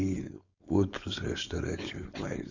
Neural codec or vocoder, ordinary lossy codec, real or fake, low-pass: codec, 16 kHz, 4.8 kbps, FACodec; Opus, 64 kbps; fake; 7.2 kHz